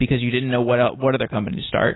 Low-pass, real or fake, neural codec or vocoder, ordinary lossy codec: 7.2 kHz; real; none; AAC, 16 kbps